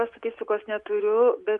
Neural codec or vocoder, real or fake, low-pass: none; real; 10.8 kHz